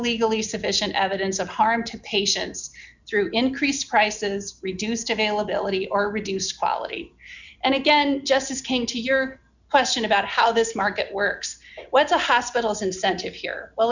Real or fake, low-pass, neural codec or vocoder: real; 7.2 kHz; none